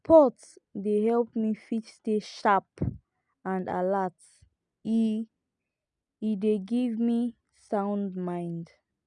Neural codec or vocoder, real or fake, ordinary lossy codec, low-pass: none; real; none; 9.9 kHz